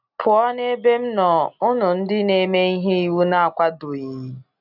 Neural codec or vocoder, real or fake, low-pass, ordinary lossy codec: none; real; 5.4 kHz; none